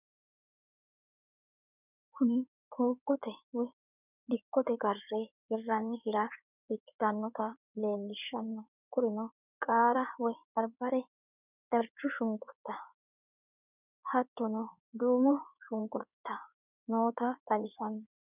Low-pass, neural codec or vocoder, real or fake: 3.6 kHz; codec, 16 kHz in and 24 kHz out, 2.2 kbps, FireRedTTS-2 codec; fake